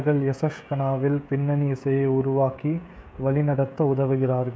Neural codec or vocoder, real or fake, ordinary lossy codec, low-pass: codec, 16 kHz, 16 kbps, FreqCodec, smaller model; fake; none; none